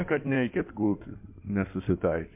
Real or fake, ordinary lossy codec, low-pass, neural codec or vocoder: fake; MP3, 32 kbps; 3.6 kHz; codec, 16 kHz in and 24 kHz out, 2.2 kbps, FireRedTTS-2 codec